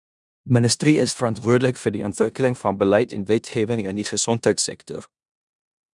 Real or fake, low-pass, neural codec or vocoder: fake; 10.8 kHz; codec, 16 kHz in and 24 kHz out, 0.9 kbps, LongCat-Audio-Codec, four codebook decoder